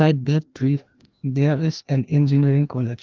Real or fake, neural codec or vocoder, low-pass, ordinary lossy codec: fake; codec, 16 kHz, 1 kbps, FreqCodec, larger model; 7.2 kHz; Opus, 32 kbps